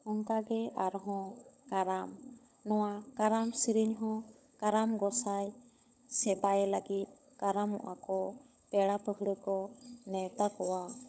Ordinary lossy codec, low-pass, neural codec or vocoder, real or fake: none; none; codec, 16 kHz, 16 kbps, FunCodec, trained on LibriTTS, 50 frames a second; fake